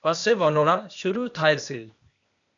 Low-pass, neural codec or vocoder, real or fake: 7.2 kHz; codec, 16 kHz, 0.8 kbps, ZipCodec; fake